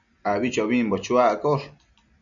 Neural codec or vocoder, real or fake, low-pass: none; real; 7.2 kHz